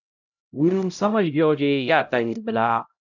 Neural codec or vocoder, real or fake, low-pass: codec, 16 kHz, 0.5 kbps, X-Codec, HuBERT features, trained on LibriSpeech; fake; 7.2 kHz